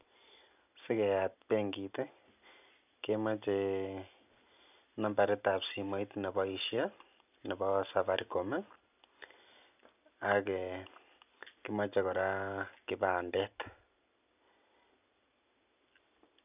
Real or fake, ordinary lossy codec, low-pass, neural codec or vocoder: real; none; 3.6 kHz; none